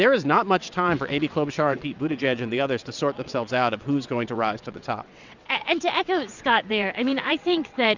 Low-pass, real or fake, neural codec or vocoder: 7.2 kHz; fake; vocoder, 22.05 kHz, 80 mel bands, WaveNeXt